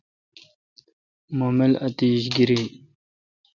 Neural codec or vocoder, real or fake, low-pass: none; real; 7.2 kHz